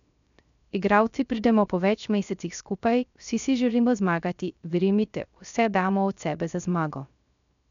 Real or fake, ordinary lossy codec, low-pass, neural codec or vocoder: fake; MP3, 96 kbps; 7.2 kHz; codec, 16 kHz, 0.3 kbps, FocalCodec